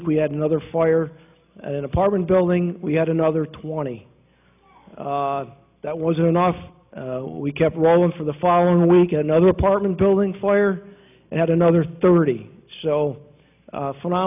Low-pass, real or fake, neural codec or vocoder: 3.6 kHz; real; none